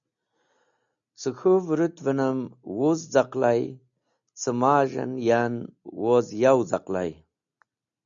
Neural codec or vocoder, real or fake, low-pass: none; real; 7.2 kHz